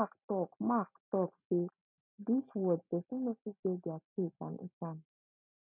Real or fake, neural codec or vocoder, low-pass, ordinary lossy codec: real; none; 3.6 kHz; none